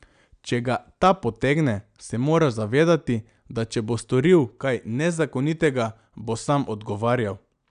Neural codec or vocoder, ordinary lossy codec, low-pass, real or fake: none; none; 9.9 kHz; real